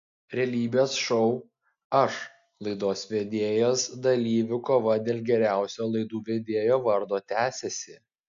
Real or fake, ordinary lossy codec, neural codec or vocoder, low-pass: real; AAC, 64 kbps; none; 7.2 kHz